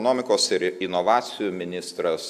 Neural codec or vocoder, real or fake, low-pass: none; real; 14.4 kHz